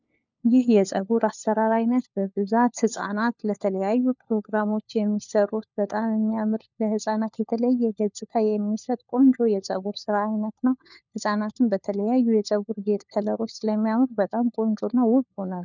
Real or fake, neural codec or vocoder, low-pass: fake; codec, 16 kHz, 4 kbps, FunCodec, trained on LibriTTS, 50 frames a second; 7.2 kHz